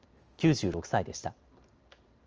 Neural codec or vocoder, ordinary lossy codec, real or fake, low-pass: none; Opus, 24 kbps; real; 7.2 kHz